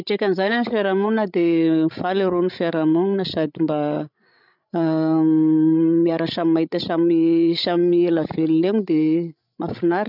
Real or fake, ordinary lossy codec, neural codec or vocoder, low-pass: fake; none; codec, 16 kHz, 16 kbps, FreqCodec, larger model; 5.4 kHz